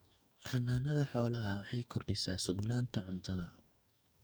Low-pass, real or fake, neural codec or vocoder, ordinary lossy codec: none; fake; codec, 44.1 kHz, 2.6 kbps, DAC; none